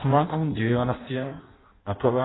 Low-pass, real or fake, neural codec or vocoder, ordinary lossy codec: 7.2 kHz; fake; codec, 16 kHz in and 24 kHz out, 0.6 kbps, FireRedTTS-2 codec; AAC, 16 kbps